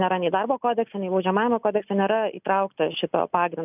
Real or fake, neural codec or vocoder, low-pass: real; none; 3.6 kHz